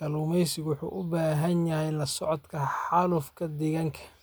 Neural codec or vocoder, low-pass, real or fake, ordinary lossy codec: none; none; real; none